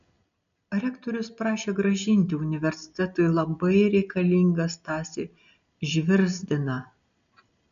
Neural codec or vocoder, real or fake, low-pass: none; real; 7.2 kHz